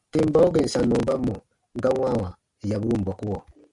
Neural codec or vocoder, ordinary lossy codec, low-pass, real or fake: none; AAC, 64 kbps; 10.8 kHz; real